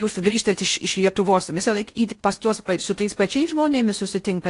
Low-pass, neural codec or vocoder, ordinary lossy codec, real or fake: 10.8 kHz; codec, 16 kHz in and 24 kHz out, 0.6 kbps, FocalCodec, streaming, 4096 codes; AAC, 48 kbps; fake